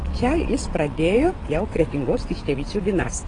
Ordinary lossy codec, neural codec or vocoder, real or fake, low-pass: AAC, 32 kbps; vocoder, 22.05 kHz, 80 mel bands, WaveNeXt; fake; 9.9 kHz